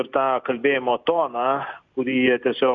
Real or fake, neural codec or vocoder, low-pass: real; none; 7.2 kHz